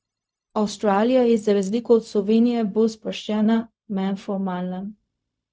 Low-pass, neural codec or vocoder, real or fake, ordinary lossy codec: none; codec, 16 kHz, 0.4 kbps, LongCat-Audio-Codec; fake; none